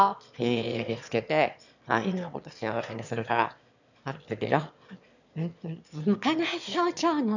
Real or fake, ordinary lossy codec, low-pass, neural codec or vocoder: fake; none; 7.2 kHz; autoencoder, 22.05 kHz, a latent of 192 numbers a frame, VITS, trained on one speaker